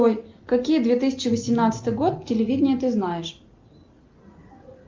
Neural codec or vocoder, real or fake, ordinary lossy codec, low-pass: none; real; Opus, 24 kbps; 7.2 kHz